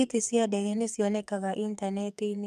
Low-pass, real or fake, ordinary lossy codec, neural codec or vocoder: 14.4 kHz; fake; MP3, 96 kbps; codec, 44.1 kHz, 2.6 kbps, SNAC